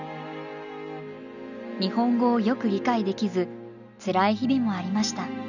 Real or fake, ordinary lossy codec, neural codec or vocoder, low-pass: real; none; none; 7.2 kHz